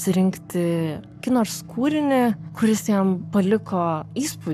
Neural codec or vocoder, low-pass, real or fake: codec, 44.1 kHz, 7.8 kbps, Pupu-Codec; 14.4 kHz; fake